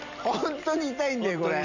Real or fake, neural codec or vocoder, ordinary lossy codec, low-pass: real; none; none; 7.2 kHz